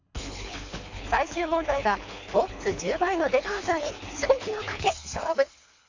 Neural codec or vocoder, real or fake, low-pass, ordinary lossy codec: codec, 24 kHz, 3 kbps, HILCodec; fake; 7.2 kHz; AAC, 48 kbps